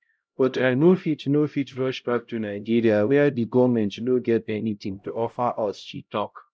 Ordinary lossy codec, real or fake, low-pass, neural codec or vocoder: none; fake; none; codec, 16 kHz, 0.5 kbps, X-Codec, HuBERT features, trained on LibriSpeech